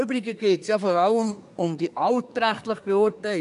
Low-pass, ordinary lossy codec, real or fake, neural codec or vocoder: 10.8 kHz; none; fake; codec, 24 kHz, 1 kbps, SNAC